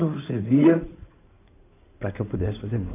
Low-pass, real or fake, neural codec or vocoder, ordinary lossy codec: 3.6 kHz; fake; codec, 16 kHz in and 24 kHz out, 2.2 kbps, FireRedTTS-2 codec; AAC, 24 kbps